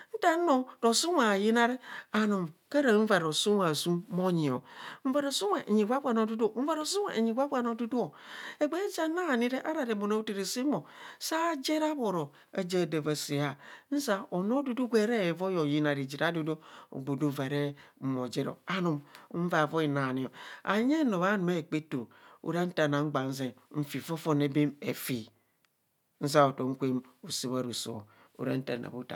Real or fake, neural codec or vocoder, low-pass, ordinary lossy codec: real; none; 19.8 kHz; none